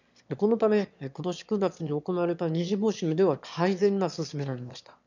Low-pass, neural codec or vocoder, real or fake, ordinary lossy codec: 7.2 kHz; autoencoder, 22.05 kHz, a latent of 192 numbers a frame, VITS, trained on one speaker; fake; none